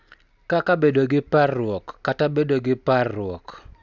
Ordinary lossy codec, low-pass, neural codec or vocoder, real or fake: none; 7.2 kHz; none; real